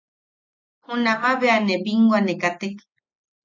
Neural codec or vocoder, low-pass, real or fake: none; 7.2 kHz; real